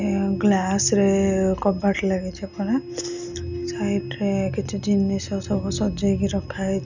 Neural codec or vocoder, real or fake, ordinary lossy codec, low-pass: none; real; none; 7.2 kHz